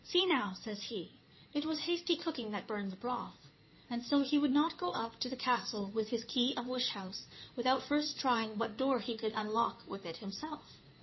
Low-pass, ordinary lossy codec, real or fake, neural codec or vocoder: 7.2 kHz; MP3, 24 kbps; fake; codec, 16 kHz in and 24 kHz out, 2.2 kbps, FireRedTTS-2 codec